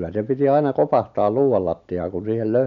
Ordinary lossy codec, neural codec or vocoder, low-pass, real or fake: none; none; 7.2 kHz; real